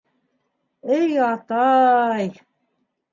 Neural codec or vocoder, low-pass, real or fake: none; 7.2 kHz; real